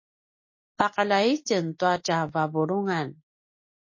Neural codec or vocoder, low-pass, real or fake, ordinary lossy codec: none; 7.2 kHz; real; MP3, 32 kbps